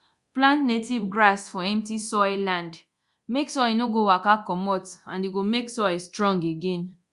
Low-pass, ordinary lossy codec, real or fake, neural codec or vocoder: 10.8 kHz; Opus, 64 kbps; fake; codec, 24 kHz, 0.9 kbps, DualCodec